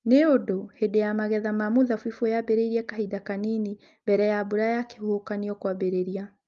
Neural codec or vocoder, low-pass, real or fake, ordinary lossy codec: none; 7.2 kHz; real; Opus, 32 kbps